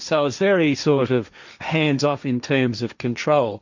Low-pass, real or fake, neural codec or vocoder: 7.2 kHz; fake; codec, 16 kHz, 1.1 kbps, Voila-Tokenizer